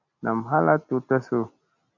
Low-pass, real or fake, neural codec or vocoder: 7.2 kHz; real; none